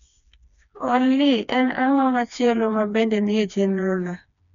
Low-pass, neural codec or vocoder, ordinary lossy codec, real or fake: 7.2 kHz; codec, 16 kHz, 2 kbps, FreqCodec, smaller model; none; fake